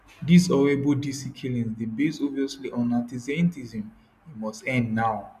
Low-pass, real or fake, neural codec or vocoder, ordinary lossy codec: 14.4 kHz; real; none; none